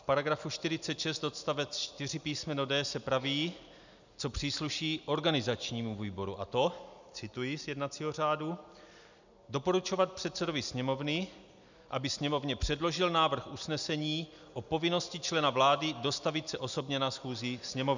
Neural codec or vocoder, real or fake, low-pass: none; real; 7.2 kHz